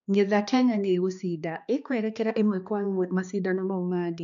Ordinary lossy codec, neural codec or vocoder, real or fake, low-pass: none; codec, 16 kHz, 2 kbps, X-Codec, HuBERT features, trained on balanced general audio; fake; 7.2 kHz